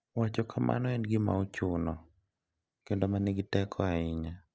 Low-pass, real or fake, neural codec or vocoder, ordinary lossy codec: none; real; none; none